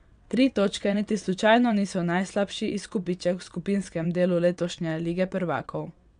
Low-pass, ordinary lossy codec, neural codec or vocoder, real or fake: 9.9 kHz; none; vocoder, 22.05 kHz, 80 mel bands, WaveNeXt; fake